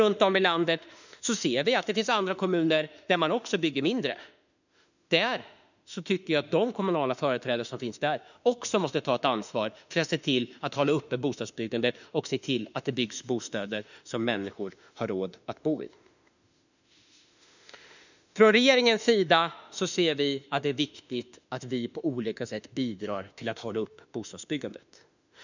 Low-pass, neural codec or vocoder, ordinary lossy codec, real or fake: 7.2 kHz; autoencoder, 48 kHz, 32 numbers a frame, DAC-VAE, trained on Japanese speech; none; fake